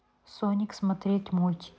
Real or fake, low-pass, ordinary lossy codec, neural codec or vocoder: real; none; none; none